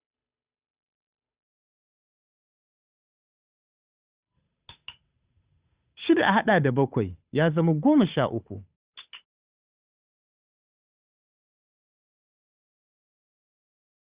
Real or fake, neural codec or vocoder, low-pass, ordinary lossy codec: fake; codec, 16 kHz, 8 kbps, FunCodec, trained on Chinese and English, 25 frames a second; 3.6 kHz; Opus, 64 kbps